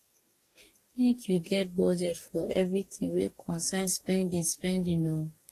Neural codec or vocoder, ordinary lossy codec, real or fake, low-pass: codec, 44.1 kHz, 2.6 kbps, DAC; AAC, 48 kbps; fake; 14.4 kHz